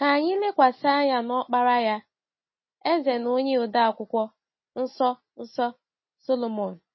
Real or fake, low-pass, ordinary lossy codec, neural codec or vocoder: real; 7.2 kHz; MP3, 24 kbps; none